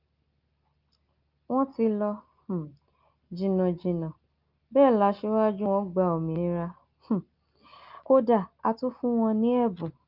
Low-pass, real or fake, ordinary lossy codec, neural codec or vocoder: 5.4 kHz; real; Opus, 24 kbps; none